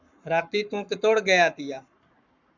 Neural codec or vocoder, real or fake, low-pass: codec, 44.1 kHz, 7.8 kbps, Pupu-Codec; fake; 7.2 kHz